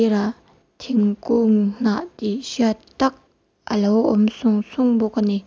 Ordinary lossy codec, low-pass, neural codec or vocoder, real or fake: none; none; none; real